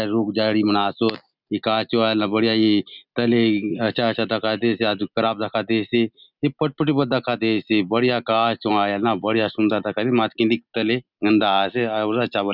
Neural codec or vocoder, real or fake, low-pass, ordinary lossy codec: none; real; 5.4 kHz; Opus, 64 kbps